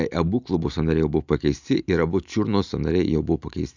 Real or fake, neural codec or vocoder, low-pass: real; none; 7.2 kHz